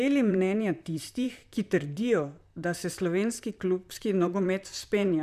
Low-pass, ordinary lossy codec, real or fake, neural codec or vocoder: 14.4 kHz; none; fake; vocoder, 44.1 kHz, 128 mel bands, Pupu-Vocoder